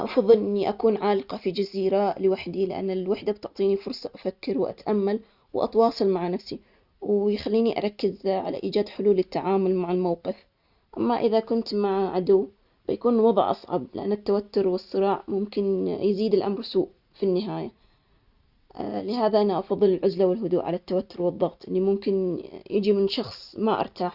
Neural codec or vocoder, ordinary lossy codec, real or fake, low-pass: none; Opus, 64 kbps; real; 5.4 kHz